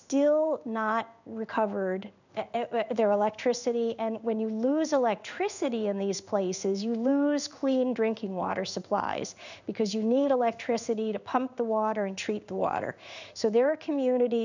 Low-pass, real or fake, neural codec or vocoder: 7.2 kHz; fake; codec, 16 kHz in and 24 kHz out, 1 kbps, XY-Tokenizer